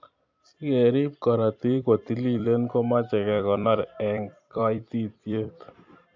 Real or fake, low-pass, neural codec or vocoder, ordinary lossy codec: fake; 7.2 kHz; vocoder, 44.1 kHz, 128 mel bands every 256 samples, BigVGAN v2; AAC, 48 kbps